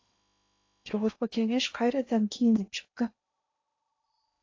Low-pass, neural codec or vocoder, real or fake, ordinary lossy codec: 7.2 kHz; codec, 16 kHz in and 24 kHz out, 0.8 kbps, FocalCodec, streaming, 65536 codes; fake; AAC, 48 kbps